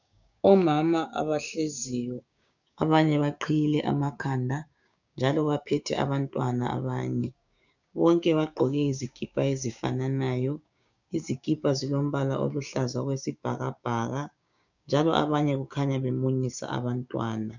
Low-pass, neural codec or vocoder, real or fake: 7.2 kHz; codec, 16 kHz, 6 kbps, DAC; fake